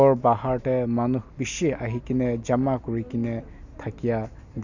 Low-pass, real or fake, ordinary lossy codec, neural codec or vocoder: 7.2 kHz; real; none; none